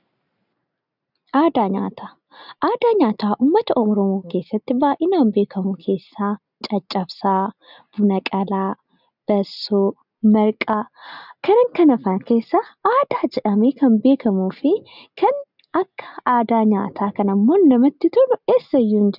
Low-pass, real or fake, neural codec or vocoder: 5.4 kHz; real; none